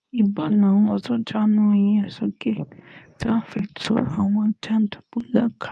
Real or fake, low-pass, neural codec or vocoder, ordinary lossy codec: fake; none; codec, 24 kHz, 0.9 kbps, WavTokenizer, medium speech release version 2; none